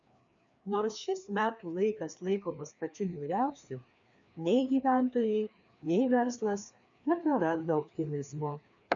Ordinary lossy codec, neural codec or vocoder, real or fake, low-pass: MP3, 96 kbps; codec, 16 kHz, 2 kbps, FreqCodec, larger model; fake; 7.2 kHz